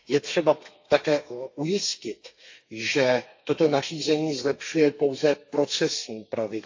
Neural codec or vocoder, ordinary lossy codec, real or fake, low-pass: codec, 32 kHz, 1.9 kbps, SNAC; AAC, 48 kbps; fake; 7.2 kHz